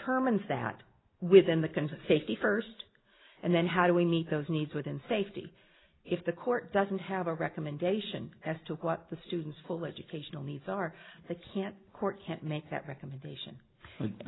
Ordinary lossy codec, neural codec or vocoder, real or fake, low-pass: AAC, 16 kbps; none; real; 7.2 kHz